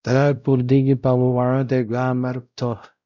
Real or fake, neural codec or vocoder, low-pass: fake; codec, 16 kHz, 0.5 kbps, X-Codec, WavLM features, trained on Multilingual LibriSpeech; 7.2 kHz